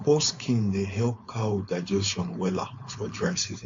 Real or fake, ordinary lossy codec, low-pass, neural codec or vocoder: fake; AAC, 48 kbps; 7.2 kHz; codec, 16 kHz, 4.8 kbps, FACodec